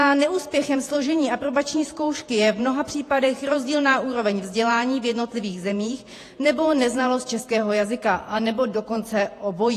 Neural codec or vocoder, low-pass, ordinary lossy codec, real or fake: vocoder, 48 kHz, 128 mel bands, Vocos; 14.4 kHz; AAC, 48 kbps; fake